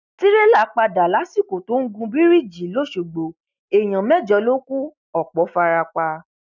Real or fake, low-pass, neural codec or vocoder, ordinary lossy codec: real; 7.2 kHz; none; none